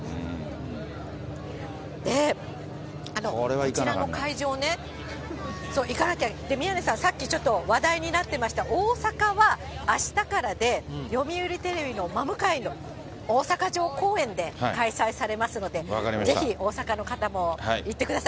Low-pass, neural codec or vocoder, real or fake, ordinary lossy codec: none; none; real; none